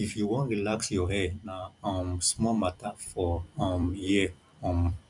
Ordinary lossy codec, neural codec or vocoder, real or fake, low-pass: none; vocoder, 44.1 kHz, 128 mel bands every 256 samples, BigVGAN v2; fake; 10.8 kHz